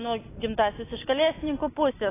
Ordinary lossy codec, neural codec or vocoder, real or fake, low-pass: AAC, 16 kbps; none; real; 3.6 kHz